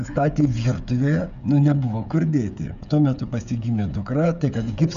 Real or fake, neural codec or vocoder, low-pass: fake; codec, 16 kHz, 16 kbps, FreqCodec, smaller model; 7.2 kHz